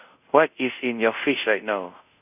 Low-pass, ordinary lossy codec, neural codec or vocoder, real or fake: 3.6 kHz; none; codec, 24 kHz, 0.5 kbps, DualCodec; fake